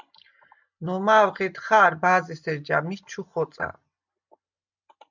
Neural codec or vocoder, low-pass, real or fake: none; 7.2 kHz; real